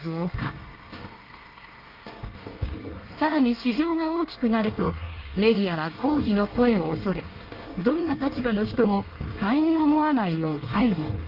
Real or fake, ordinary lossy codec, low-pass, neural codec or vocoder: fake; Opus, 32 kbps; 5.4 kHz; codec, 24 kHz, 1 kbps, SNAC